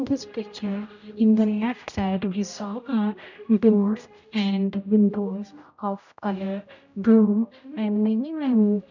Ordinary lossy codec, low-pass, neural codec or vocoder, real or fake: none; 7.2 kHz; codec, 16 kHz, 0.5 kbps, X-Codec, HuBERT features, trained on general audio; fake